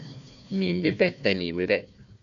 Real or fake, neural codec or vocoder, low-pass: fake; codec, 16 kHz, 1 kbps, FunCodec, trained on Chinese and English, 50 frames a second; 7.2 kHz